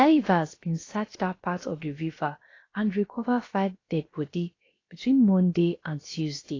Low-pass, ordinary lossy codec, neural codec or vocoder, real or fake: 7.2 kHz; AAC, 32 kbps; codec, 16 kHz, about 1 kbps, DyCAST, with the encoder's durations; fake